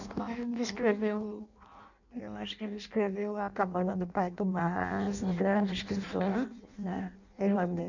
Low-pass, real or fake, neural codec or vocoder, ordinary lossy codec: 7.2 kHz; fake; codec, 16 kHz in and 24 kHz out, 0.6 kbps, FireRedTTS-2 codec; none